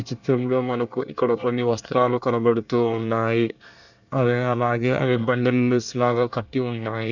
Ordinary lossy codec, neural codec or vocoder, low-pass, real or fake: none; codec, 24 kHz, 1 kbps, SNAC; 7.2 kHz; fake